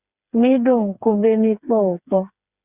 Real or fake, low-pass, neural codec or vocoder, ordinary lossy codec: fake; 3.6 kHz; codec, 16 kHz, 4 kbps, FreqCodec, smaller model; Opus, 64 kbps